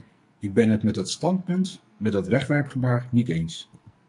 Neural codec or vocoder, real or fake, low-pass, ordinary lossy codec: codec, 44.1 kHz, 2.6 kbps, SNAC; fake; 10.8 kHz; MP3, 64 kbps